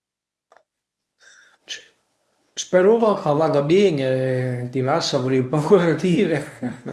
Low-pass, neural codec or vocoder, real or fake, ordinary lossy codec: none; codec, 24 kHz, 0.9 kbps, WavTokenizer, medium speech release version 1; fake; none